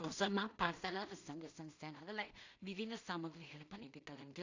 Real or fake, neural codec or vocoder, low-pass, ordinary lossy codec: fake; codec, 16 kHz in and 24 kHz out, 0.4 kbps, LongCat-Audio-Codec, two codebook decoder; 7.2 kHz; AAC, 48 kbps